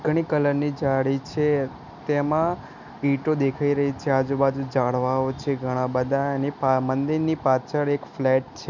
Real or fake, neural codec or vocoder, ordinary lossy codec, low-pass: real; none; none; 7.2 kHz